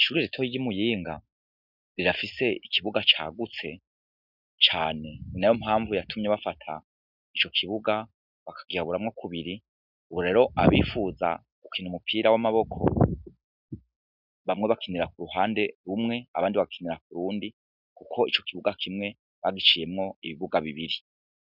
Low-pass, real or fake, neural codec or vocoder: 5.4 kHz; real; none